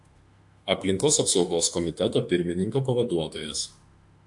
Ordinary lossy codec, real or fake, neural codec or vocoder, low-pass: AAC, 64 kbps; fake; autoencoder, 48 kHz, 32 numbers a frame, DAC-VAE, trained on Japanese speech; 10.8 kHz